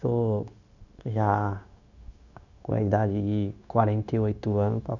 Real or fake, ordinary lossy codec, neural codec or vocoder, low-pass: fake; none; codec, 16 kHz, 0.9 kbps, LongCat-Audio-Codec; 7.2 kHz